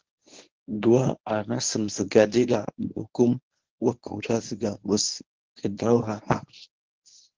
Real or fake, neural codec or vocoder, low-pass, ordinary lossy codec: fake; codec, 24 kHz, 0.9 kbps, WavTokenizer, medium speech release version 1; 7.2 kHz; Opus, 16 kbps